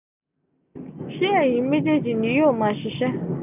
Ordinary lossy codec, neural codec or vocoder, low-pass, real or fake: none; none; 3.6 kHz; real